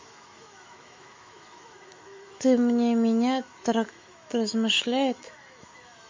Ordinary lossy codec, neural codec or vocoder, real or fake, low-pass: MP3, 48 kbps; autoencoder, 48 kHz, 128 numbers a frame, DAC-VAE, trained on Japanese speech; fake; 7.2 kHz